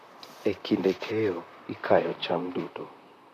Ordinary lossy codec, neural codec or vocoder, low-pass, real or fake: none; vocoder, 44.1 kHz, 128 mel bands, Pupu-Vocoder; 14.4 kHz; fake